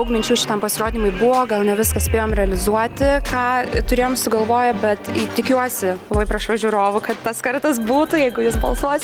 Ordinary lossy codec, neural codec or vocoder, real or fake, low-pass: Opus, 24 kbps; none; real; 19.8 kHz